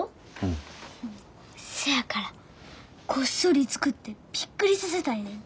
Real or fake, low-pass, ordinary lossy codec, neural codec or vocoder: real; none; none; none